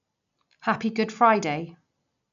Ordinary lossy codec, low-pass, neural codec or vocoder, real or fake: none; 7.2 kHz; none; real